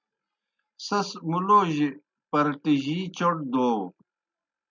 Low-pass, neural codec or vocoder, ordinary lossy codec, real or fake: 7.2 kHz; none; MP3, 64 kbps; real